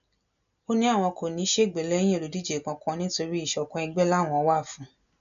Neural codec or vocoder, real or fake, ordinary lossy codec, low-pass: none; real; none; 7.2 kHz